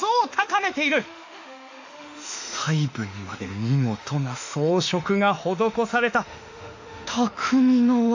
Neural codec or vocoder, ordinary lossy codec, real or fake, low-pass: autoencoder, 48 kHz, 32 numbers a frame, DAC-VAE, trained on Japanese speech; AAC, 48 kbps; fake; 7.2 kHz